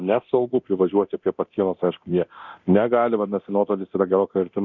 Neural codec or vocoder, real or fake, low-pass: codec, 24 kHz, 0.9 kbps, DualCodec; fake; 7.2 kHz